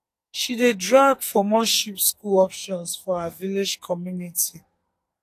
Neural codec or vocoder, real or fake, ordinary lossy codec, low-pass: codec, 44.1 kHz, 2.6 kbps, SNAC; fake; AAC, 64 kbps; 14.4 kHz